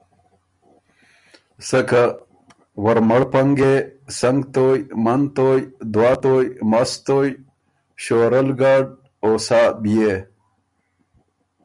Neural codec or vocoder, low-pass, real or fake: none; 10.8 kHz; real